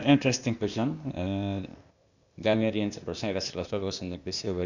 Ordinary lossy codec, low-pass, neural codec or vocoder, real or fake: none; 7.2 kHz; codec, 16 kHz, 0.8 kbps, ZipCodec; fake